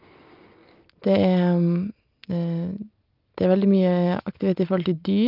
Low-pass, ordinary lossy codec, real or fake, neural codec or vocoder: 5.4 kHz; Opus, 24 kbps; real; none